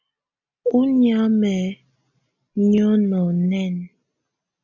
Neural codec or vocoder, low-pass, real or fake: none; 7.2 kHz; real